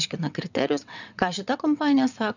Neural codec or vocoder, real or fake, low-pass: vocoder, 22.05 kHz, 80 mel bands, WaveNeXt; fake; 7.2 kHz